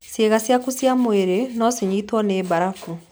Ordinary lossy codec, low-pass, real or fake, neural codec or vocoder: none; none; real; none